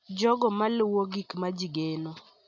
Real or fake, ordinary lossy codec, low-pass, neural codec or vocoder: real; none; 7.2 kHz; none